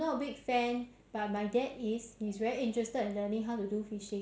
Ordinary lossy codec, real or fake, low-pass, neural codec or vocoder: none; real; none; none